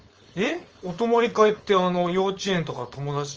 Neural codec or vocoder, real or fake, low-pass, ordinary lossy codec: codec, 16 kHz, 4.8 kbps, FACodec; fake; 7.2 kHz; Opus, 24 kbps